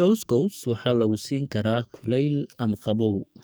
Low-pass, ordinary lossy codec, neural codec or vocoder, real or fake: none; none; codec, 44.1 kHz, 2.6 kbps, SNAC; fake